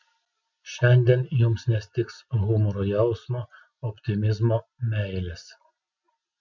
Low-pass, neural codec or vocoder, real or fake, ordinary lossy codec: 7.2 kHz; none; real; MP3, 64 kbps